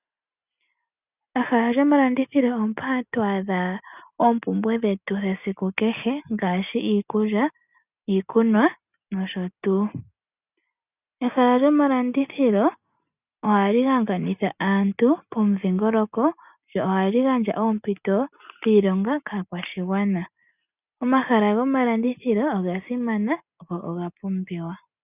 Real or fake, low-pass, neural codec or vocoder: real; 3.6 kHz; none